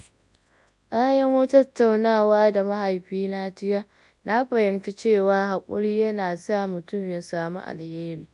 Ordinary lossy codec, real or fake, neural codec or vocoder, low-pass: AAC, 64 kbps; fake; codec, 24 kHz, 0.9 kbps, WavTokenizer, large speech release; 10.8 kHz